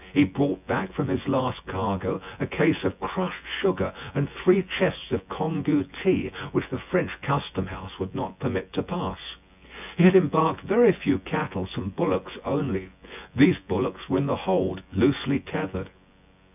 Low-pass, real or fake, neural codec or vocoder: 3.6 kHz; fake; vocoder, 24 kHz, 100 mel bands, Vocos